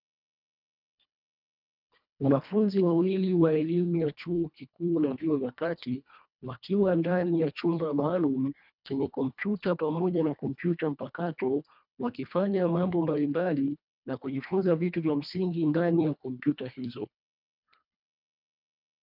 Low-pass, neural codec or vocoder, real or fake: 5.4 kHz; codec, 24 kHz, 1.5 kbps, HILCodec; fake